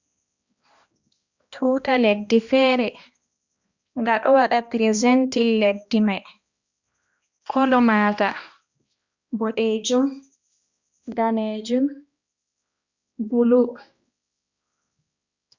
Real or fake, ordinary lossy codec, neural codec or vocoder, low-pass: fake; Opus, 64 kbps; codec, 16 kHz, 1 kbps, X-Codec, HuBERT features, trained on balanced general audio; 7.2 kHz